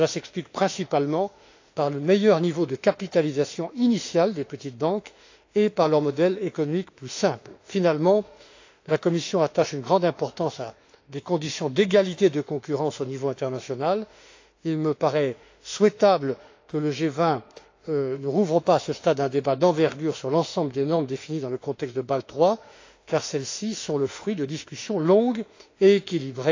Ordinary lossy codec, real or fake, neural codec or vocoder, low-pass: AAC, 48 kbps; fake; autoencoder, 48 kHz, 32 numbers a frame, DAC-VAE, trained on Japanese speech; 7.2 kHz